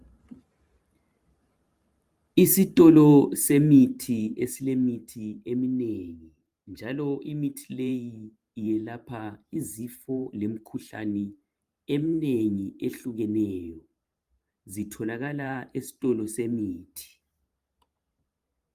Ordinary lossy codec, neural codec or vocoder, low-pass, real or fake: Opus, 32 kbps; vocoder, 44.1 kHz, 128 mel bands every 512 samples, BigVGAN v2; 14.4 kHz; fake